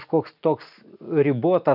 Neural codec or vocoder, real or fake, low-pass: none; real; 5.4 kHz